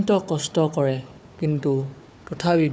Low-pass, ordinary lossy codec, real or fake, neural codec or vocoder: none; none; fake; codec, 16 kHz, 4 kbps, FunCodec, trained on Chinese and English, 50 frames a second